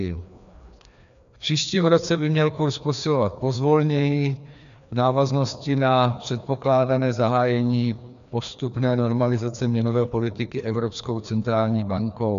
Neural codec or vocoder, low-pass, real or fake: codec, 16 kHz, 2 kbps, FreqCodec, larger model; 7.2 kHz; fake